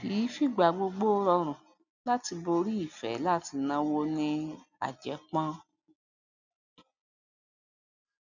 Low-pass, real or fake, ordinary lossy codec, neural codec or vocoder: 7.2 kHz; real; none; none